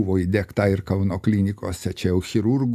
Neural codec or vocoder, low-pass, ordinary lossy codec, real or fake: none; 14.4 kHz; AAC, 96 kbps; real